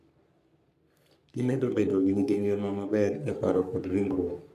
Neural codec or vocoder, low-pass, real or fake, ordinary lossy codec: codec, 44.1 kHz, 3.4 kbps, Pupu-Codec; 14.4 kHz; fake; none